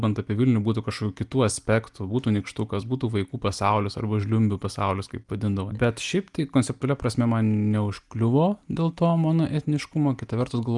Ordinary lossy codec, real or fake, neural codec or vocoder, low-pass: Opus, 32 kbps; real; none; 10.8 kHz